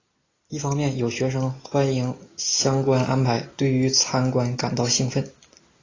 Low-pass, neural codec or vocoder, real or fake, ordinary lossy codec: 7.2 kHz; none; real; AAC, 32 kbps